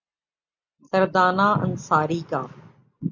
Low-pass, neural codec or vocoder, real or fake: 7.2 kHz; none; real